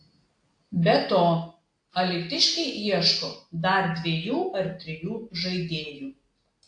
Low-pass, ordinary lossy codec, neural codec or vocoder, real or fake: 9.9 kHz; AAC, 48 kbps; none; real